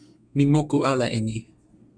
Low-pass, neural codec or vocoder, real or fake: 9.9 kHz; codec, 32 kHz, 1.9 kbps, SNAC; fake